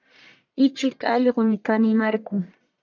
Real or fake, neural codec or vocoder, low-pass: fake; codec, 44.1 kHz, 1.7 kbps, Pupu-Codec; 7.2 kHz